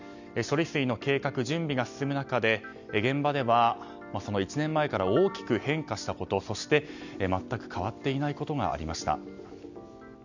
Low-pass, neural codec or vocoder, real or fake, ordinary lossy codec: 7.2 kHz; none; real; none